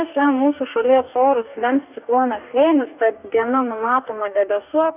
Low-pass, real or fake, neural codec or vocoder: 3.6 kHz; fake; codec, 44.1 kHz, 2.6 kbps, DAC